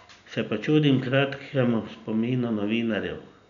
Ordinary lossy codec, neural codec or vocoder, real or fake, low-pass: none; none; real; 7.2 kHz